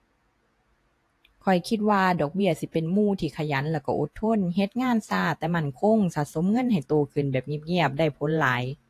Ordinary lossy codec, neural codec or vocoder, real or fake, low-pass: AAC, 64 kbps; vocoder, 48 kHz, 128 mel bands, Vocos; fake; 14.4 kHz